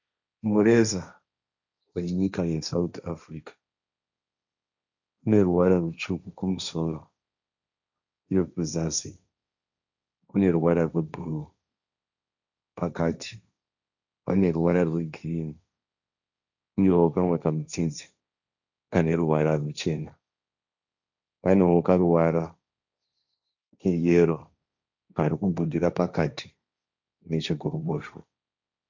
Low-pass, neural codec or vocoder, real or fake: 7.2 kHz; codec, 16 kHz, 1.1 kbps, Voila-Tokenizer; fake